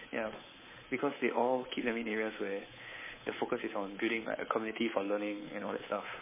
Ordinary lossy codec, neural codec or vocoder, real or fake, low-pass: MP3, 16 kbps; codec, 24 kHz, 3.1 kbps, DualCodec; fake; 3.6 kHz